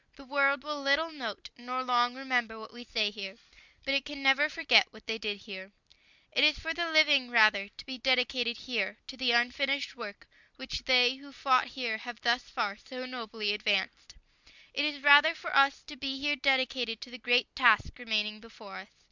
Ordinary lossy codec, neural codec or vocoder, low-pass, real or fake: Opus, 64 kbps; none; 7.2 kHz; real